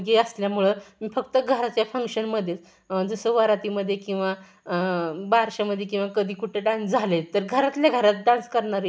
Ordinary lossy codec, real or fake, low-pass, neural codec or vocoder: none; real; none; none